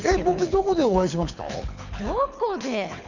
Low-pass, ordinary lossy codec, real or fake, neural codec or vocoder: 7.2 kHz; none; fake; codec, 24 kHz, 6 kbps, HILCodec